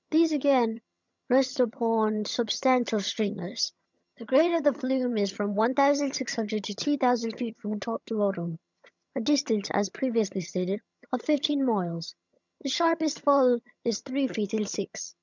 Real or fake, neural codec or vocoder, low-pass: fake; vocoder, 22.05 kHz, 80 mel bands, HiFi-GAN; 7.2 kHz